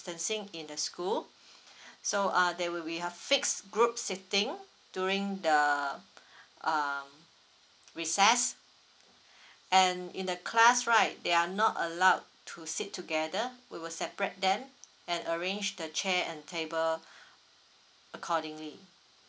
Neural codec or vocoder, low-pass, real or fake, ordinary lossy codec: none; none; real; none